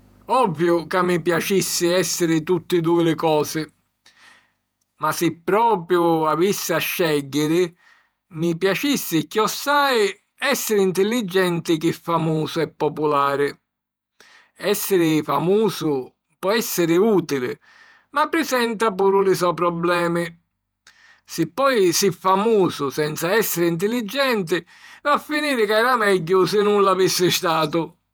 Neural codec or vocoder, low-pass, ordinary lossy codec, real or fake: vocoder, 48 kHz, 128 mel bands, Vocos; none; none; fake